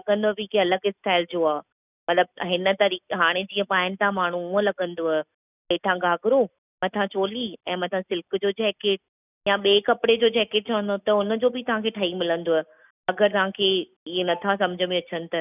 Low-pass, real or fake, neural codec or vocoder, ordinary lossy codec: 3.6 kHz; real; none; none